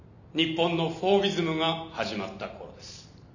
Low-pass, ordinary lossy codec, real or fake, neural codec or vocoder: 7.2 kHz; none; real; none